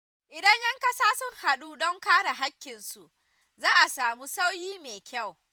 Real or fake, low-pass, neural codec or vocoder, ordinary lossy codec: fake; none; vocoder, 48 kHz, 128 mel bands, Vocos; none